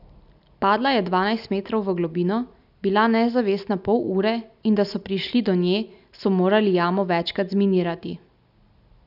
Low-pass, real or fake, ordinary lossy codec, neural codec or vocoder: 5.4 kHz; real; none; none